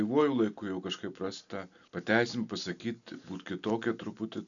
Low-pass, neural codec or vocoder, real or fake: 7.2 kHz; none; real